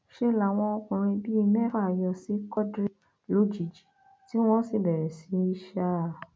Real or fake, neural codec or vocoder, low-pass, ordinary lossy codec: real; none; none; none